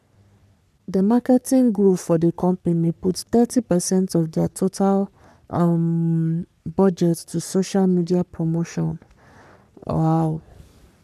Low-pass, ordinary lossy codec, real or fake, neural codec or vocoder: 14.4 kHz; none; fake; codec, 44.1 kHz, 3.4 kbps, Pupu-Codec